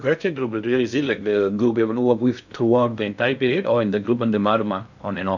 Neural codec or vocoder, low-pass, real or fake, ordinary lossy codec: codec, 16 kHz in and 24 kHz out, 0.6 kbps, FocalCodec, streaming, 2048 codes; 7.2 kHz; fake; none